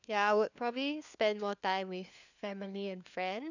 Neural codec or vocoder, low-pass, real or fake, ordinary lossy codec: codec, 16 kHz, 4 kbps, FunCodec, trained on LibriTTS, 50 frames a second; 7.2 kHz; fake; none